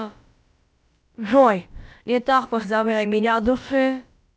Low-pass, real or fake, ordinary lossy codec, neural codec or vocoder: none; fake; none; codec, 16 kHz, about 1 kbps, DyCAST, with the encoder's durations